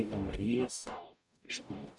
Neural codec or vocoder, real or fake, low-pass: codec, 44.1 kHz, 0.9 kbps, DAC; fake; 10.8 kHz